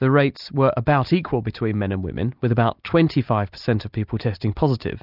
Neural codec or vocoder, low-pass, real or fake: none; 5.4 kHz; real